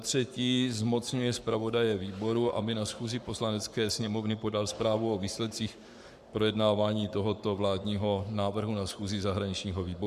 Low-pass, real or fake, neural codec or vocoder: 14.4 kHz; fake; codec, 44.1 kHz, 7.8 kbps, Pupu-Codec